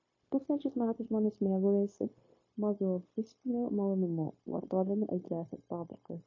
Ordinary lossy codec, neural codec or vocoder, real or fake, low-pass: MP3, 32 kbps; codec, 16 kHz, 0.9 kbps, LongCat-Audio-Codec; fake; 7.2 kHz